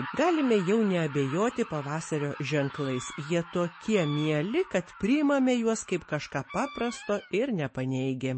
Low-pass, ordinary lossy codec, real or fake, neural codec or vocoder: 9.9 kHz; MP3, 32 kbps; fake; autoencoder, 48 kHz, 128 numbers a frame, DAC-VAE, trained on Japanese speech